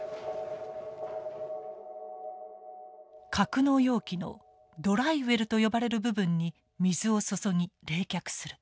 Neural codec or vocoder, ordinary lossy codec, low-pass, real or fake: none; none; none; real